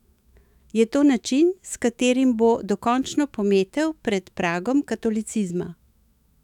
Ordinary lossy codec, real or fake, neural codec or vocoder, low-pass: none; fake; autoencoder, 48 kHz, 128 numbers a frame, DAC-VAE, trained on Japanese speech; 19.8 kHz